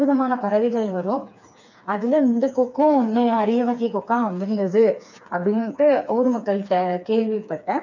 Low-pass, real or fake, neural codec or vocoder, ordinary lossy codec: 7.2 kHz; fake; codec, 16 kHz, 4 kbps, FreqCodec, smaller model; none